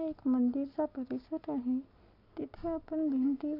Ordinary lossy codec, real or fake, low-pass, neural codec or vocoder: none; real; 5.4 kHz; none